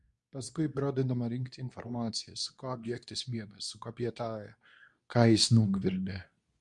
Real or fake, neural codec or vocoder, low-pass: fake; codec, 24 kHz, 0.9 kbps, WavTokenizer, medium speech release version 2; 10.8 kHz